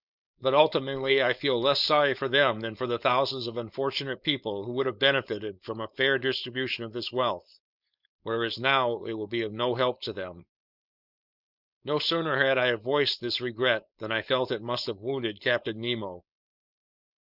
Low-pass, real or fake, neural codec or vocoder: 5.4 kHz; fake; codec, 16 kHz, 4.8 kbps, FACodec